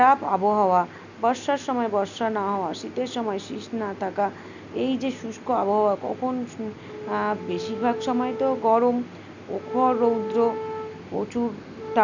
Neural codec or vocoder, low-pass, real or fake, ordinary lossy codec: none; 7.2 kHz; real; none